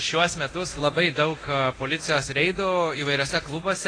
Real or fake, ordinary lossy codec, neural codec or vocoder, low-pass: fake; AAC, 32 kbps; codec, 24 kHz, 0.9 kbps, DualCodec; 9.9 kHz